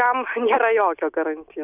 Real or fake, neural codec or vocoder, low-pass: real; none; 3.6 kHz